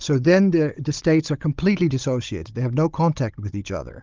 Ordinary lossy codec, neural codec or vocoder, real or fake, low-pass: Opus, 32 kbps; codec, 16 kHz, 16 kbps, FunCodec, trained on Chinese and English, 50 frames a second; fake; 7.2 kHz